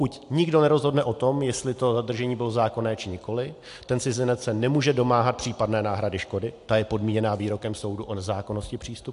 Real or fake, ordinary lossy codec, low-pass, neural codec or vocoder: real; AAC, 64 kbps; 10.8 kHz; none